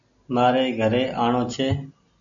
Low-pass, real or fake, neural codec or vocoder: 7.2 kHz; real; none